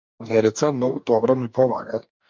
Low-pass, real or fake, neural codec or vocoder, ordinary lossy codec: 7.2 kHz; fake; codec, 44.1 kHz, 2.6 kbps, DAC; MP3, 64 kbps